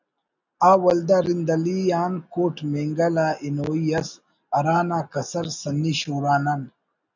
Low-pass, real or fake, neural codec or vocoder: 7.2 kHz; real; none